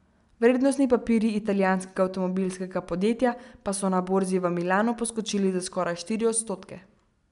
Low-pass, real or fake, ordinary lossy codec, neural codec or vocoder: 10.8 kHz; real; MP3, 96 kbps; none